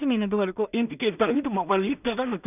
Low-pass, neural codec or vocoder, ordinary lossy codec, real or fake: 3.6 kHz; codec, 16 kHz in and 24 kHz out, 0.4 kbps, LongCat-Audio-Codec, two codebook decoder; none; fake